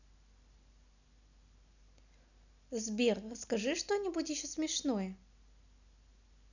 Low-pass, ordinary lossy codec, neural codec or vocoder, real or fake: 7.2 kHz; none; none; real